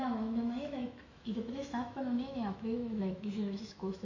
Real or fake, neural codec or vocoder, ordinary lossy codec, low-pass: fake; autoencoder, 48 kHz, 128 numbers a frame, DAC-VAE, trained on Japanese speech; AAC, 48 kbps; 7.2 kHz